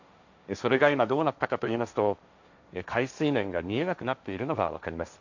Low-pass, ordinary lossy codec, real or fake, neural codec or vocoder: 7.2 kHz; none; fake; codec, 16 kHz, 1.1 kbps, Voila-Tokenizer